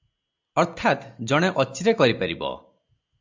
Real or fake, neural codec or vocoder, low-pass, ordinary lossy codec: real; none; 7.2 kHz; MP3, 64 kbps